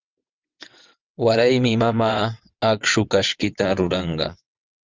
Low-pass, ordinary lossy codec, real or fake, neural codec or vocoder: 7.2 kHz; Opus, 32 kbps; fake; vocoder, 22.05 kHz, 80 mel bands, WaveNeXt